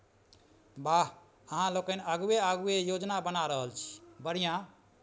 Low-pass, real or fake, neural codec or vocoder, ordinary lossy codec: none; real; none; none